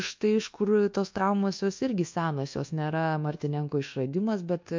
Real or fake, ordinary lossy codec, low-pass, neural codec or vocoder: fake; MP3, 48 kbps; 7.2 kHz; codec, 24 kHz, 1.2 kbps, DualCodec